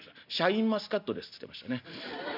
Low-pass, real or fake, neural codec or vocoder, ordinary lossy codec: 5.4 kHz; real; none; none